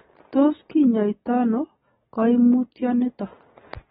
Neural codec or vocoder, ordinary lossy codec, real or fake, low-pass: none; AAC, 16 kbps; real; 19.8 kHz